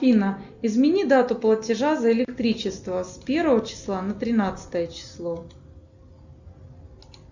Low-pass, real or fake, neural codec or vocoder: 7.2 kHz; real; none